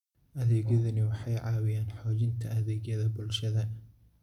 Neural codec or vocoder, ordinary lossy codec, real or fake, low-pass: none; none; real; 19.8 kHz